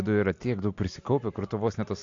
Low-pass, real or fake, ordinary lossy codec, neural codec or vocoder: 7.2 kHz; real; AAC, 48 kbps; none